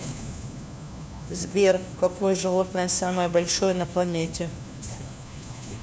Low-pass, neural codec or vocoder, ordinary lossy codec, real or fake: none; codec, 16 kHz, 1 kbps, FunCodec, trained on LibriTTS, 50 frames a second; none; fake